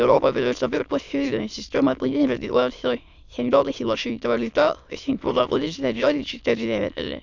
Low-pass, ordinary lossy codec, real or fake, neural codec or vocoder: 7.2 kHz; none; fake; autoencoder, 22.05 kHz, a latent of 192 numbers a frame, VITS, trained on many speakers